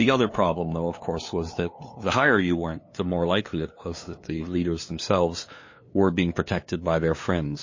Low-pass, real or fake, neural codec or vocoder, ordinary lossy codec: 7.2 kHz; fake; codec, 16 kHz, 2 kbps, FunCodec, trained on LibriTTS, 25 frames a second; MP3, 32 kbps